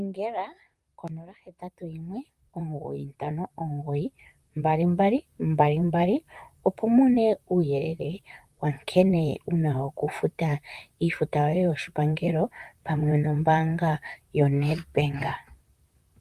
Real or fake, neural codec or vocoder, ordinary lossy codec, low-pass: fake; vocoder, 44.1 kHz, 128 mel bands, Pupu-Vocoder; Opus, 32 kbps; 14.4 kHz